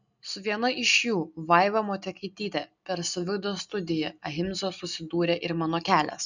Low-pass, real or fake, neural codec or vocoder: 7.2 kHz; real; none